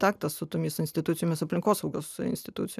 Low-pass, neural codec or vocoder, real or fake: 14.4 kHz; none; real